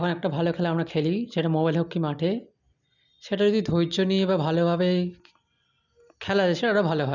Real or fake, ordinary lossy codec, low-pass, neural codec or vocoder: real; none; 7.2 kHz; none